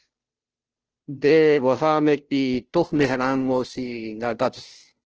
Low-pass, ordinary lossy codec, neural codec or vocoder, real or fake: 7.2 kHz; Opus, 16 kbps; codec, 16 kHz, 0.5 kbps, FunCodec, trained on Chinese and English, 25 frames a second; fake